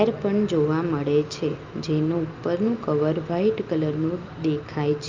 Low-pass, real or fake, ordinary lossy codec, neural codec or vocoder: 7.2 kHz; real; Opus, 24 kbps; none